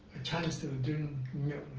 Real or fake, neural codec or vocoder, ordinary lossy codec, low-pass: real; none; Opus, 16 kbps; 7.2 kHz